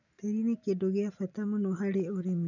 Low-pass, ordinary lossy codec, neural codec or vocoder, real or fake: 7.2 kHz; none; none; real